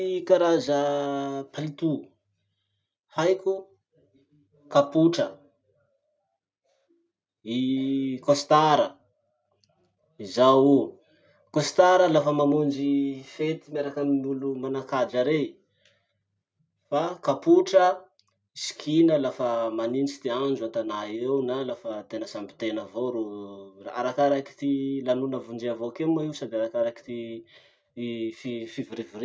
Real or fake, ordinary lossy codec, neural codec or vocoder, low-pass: real; none; none; none